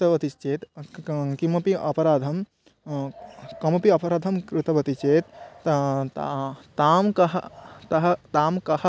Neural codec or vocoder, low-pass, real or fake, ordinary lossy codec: none; none; real; none